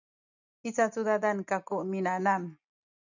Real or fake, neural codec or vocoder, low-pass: real; none; 7.2 kHz